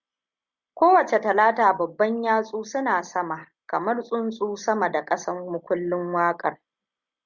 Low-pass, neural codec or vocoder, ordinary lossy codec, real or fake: 7.2 kHz; none; Opus, 64 kbps; real